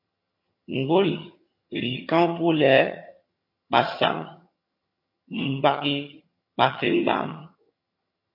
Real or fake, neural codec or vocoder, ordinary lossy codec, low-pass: fake; vocoder, 22.05 kHz, 80 mel bands, HiFi-GAN; MP3, 32 kbps; 5.4 kHz